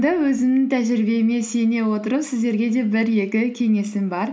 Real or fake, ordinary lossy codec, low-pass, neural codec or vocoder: real; none; none; none